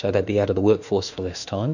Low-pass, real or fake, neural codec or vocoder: 7.2 kHz; fake; autoencoder, 48 kHz, 32 numbers a frame, DAC-VAE, trained on Japanese speech